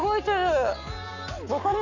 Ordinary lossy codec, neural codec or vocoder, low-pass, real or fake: none; codec, 44.1 kHz, 7.8 kbps, Pupu-Codec; 7.2 kHz; fake